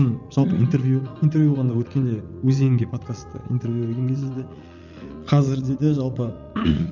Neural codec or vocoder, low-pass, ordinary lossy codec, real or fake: vocoder, 44.1 kHz, 80 mel bands, Vocos; 7.2 kHz; none; fake